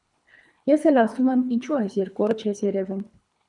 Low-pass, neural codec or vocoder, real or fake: 10.8 kHz; codec, 24 kHz, 3 kbps, HILCodec; fake